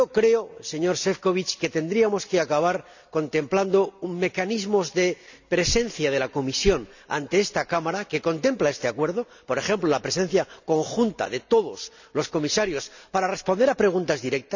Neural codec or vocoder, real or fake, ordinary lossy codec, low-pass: none; real; none; 7.2 kHz